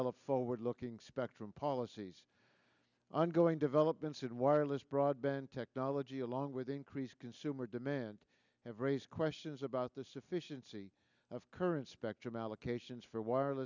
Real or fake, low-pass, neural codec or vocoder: real; 7.2 kHz; none